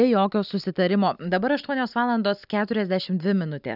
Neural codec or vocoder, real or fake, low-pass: none; real; 5.4 kHz